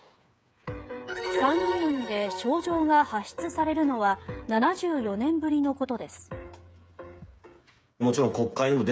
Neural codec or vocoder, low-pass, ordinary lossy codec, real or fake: codec, 16 kHz, 8 kbps, FreqCodec, smaller model; none; none; fake